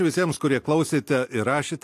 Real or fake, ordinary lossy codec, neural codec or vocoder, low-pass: real; AAC, 64 kbps; none; 14.4 kHz